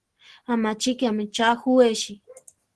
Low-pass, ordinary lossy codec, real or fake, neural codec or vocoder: 10.8 kHz; Opus, 16 kbps; real; none